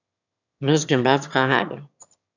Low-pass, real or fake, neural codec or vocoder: 7.2 kHz; fake; autoencoder, 22.05 kHz, a latent of 192 numbers a frame, VITS, trained on one speaker